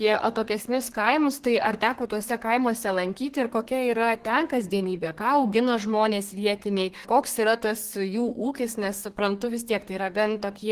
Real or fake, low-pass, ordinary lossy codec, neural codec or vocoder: fake; 14.4 kHz; Opus, 16 kbps; codec, 32 kHz, 1.9 kbps, SNAC